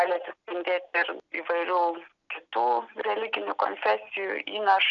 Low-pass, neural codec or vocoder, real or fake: 7.2 kHz; none; real